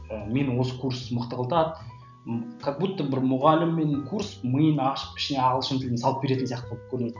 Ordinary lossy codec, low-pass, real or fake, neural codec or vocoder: none; 7.2 kHz; real; none